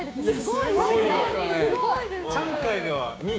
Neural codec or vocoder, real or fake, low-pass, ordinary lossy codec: codec, 16 kHz, 6 kbps, DAC; fake; none; none